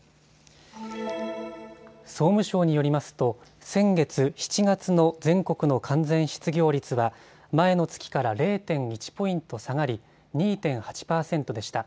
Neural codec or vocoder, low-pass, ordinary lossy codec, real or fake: none; none; none; real